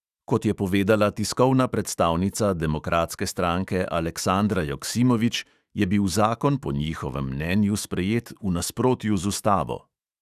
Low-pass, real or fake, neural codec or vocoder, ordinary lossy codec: 14.4 kHz; fake; autoencoder, 48 kHz, 128 numbers a frame, DAC-VAE, trained on Japanese speech; Opus, 64 kbps